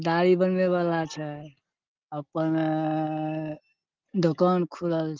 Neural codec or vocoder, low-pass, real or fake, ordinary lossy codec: none; 7.2 kHz; real; Opus, 24 kbps